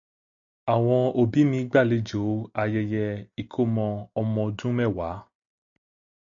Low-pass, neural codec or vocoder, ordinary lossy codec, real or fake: 7.2 kHz; none; AAC, 64 kbps; real